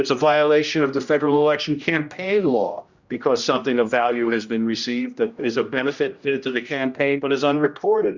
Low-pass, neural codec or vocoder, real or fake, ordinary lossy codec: 7.2 kHz; codec, 16 kHz, 1 kbps, X-Codec, HuBERT features, trained on general audio; fake; Opus, 64 kbps